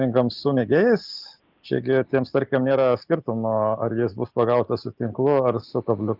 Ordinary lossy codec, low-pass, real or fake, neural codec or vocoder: Opus, 32 kbps; 5.4 kHz; real; none